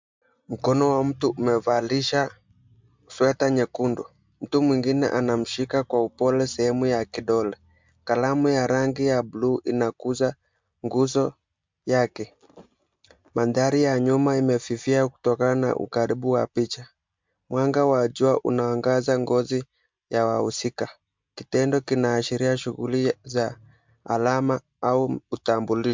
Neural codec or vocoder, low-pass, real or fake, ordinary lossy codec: none; 7.2 kHz; real; MP3, 64 kbps